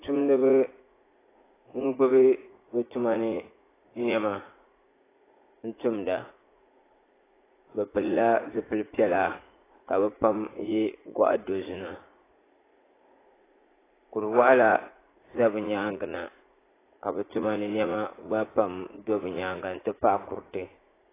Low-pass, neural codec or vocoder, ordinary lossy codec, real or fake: 3.6 kHz; vocoder, 44.1 kHz, 80 mel bands, Vocos; AAC, 16 kbps; fake